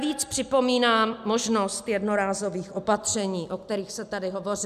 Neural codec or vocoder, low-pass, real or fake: none; 14.4 kHz; real